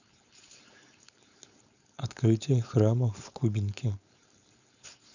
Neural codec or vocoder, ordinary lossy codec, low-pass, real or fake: codec, 16 kHz, 4.8 kbps, FACodec; none; 7.2 kHz; fake